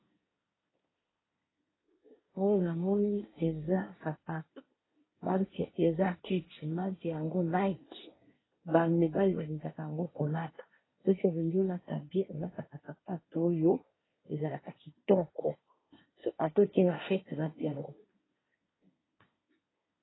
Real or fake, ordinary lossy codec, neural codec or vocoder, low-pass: fake; AAC, 16 kbps; codec, 24 kHz, 1 kbps, SNAC; 7.2 kHz